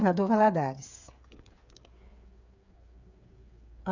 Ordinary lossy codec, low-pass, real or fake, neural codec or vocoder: none; 7.2 kHz; fake; codec, 16 kHz, 8 kbps, FreqCodec, smaller model